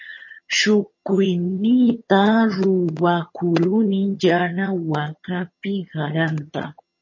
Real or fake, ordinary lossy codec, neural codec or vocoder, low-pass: fake; MP3, 32 kbps; vocoder, 22.05 kHz, 80 mel bands, HiFi-GAN; 7.2 kHz